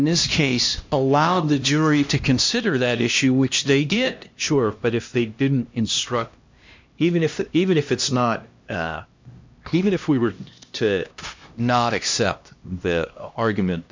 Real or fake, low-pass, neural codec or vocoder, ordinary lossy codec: fake; 7.2 kHz; codec, 16 kHz, 1 kbps, X-Codec, HuBERT features, trained on LibriSpeech; MP3, 64 kbps